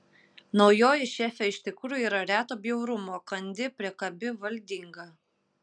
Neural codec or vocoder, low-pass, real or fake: none; 9.9 kHz; real